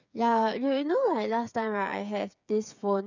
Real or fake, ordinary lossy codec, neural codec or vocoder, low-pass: fake; none; codec, 16 kHz, 8 kbps, FreqCodec, smaller model; 7.2 kHz